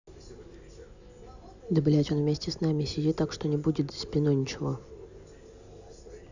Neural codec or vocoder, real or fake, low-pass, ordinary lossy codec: none; real; 7.2 kHz; none